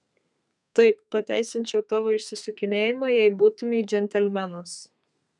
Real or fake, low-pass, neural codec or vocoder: fake; 10.8 kHz; codec, 32 kHz, 1.9 kbps, SNAC